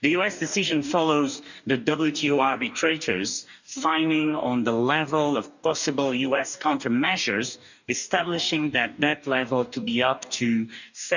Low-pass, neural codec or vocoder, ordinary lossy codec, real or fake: 7.2 kHz; codec, 44.1 kHz, 2.6 kbps, DAC; none; fake